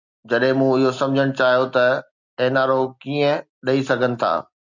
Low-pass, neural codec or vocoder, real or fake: 7.2 kHz; none; real